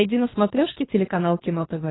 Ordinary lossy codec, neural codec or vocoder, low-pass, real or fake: AAC, 16 kbps; codec, 24 kHz, 3 kbps, HILCodec; 7.2 kHz; fake